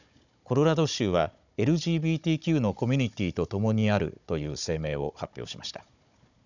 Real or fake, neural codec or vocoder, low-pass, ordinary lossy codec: fake; codec, 16 kHz, 16 kbps, FunCodec, trained on Chinese and English, 50 frames a second; 7.2 kHz; none